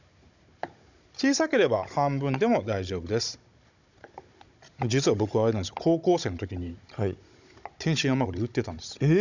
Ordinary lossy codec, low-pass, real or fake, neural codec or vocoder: none; 7.2 kHz; fake; codec, 16 kHz, 16 kbps, FunCodec, trained on Chinese and English, 50 frames a second